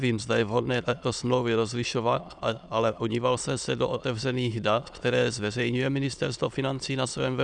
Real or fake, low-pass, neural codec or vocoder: fake; 9.9 kHz; autoencoder, 22.05 kHz, a latent of 192 numbers a frame, VITS, trained on many speakers